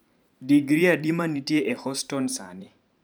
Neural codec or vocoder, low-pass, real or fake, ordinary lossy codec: vocoder, 44.1 kHz, 128 mel bands every 512 samples, BigVGAN v2; none; fake; none